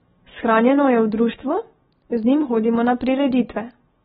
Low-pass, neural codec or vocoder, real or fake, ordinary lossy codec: 19.8 kHz; none; real; AAC, 16 kbps